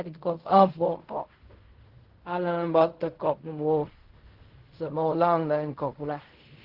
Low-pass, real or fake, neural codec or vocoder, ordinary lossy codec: 5.4 kHz; fake; codec, 16 kHz in and 24 kHz out, 0.4 kbps, LongCat-Audio-Codec, fine tuned four codebook decoder; Opus, 16 kbps